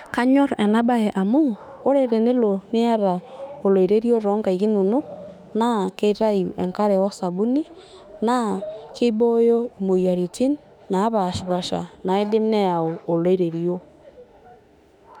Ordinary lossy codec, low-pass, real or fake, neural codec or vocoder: none; 19.8 kHz; fake; autoencoder, 48 kHz, 32 numbers a frame, DAC-VAE, trained on Japanese speech